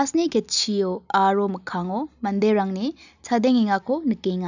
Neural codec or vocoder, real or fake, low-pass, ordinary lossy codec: none; real; 7.2 kHz; none